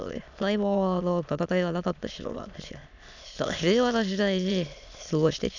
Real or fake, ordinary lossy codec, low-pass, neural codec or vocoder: fake; none; 7.2 kHz; autoencoder, 22.05 kHz, a latent of 192 numbers a frame, VITS, trained on many speakers